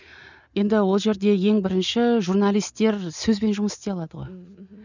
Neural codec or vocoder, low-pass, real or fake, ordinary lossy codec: none; 7.2 kHz; real; none